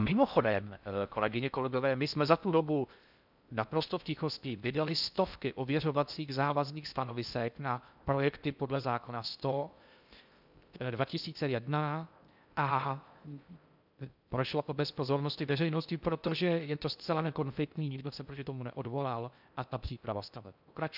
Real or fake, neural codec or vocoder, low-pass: fake; codec, 16 kHz in and 24 kHz out, 0.6 kbps, FocalCodec, streaming, 4096 codes; 5.4 kHz